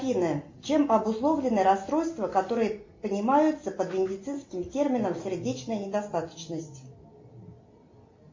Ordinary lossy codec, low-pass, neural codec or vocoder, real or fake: MP3, 48 kbps; 7.2 kHz; none; real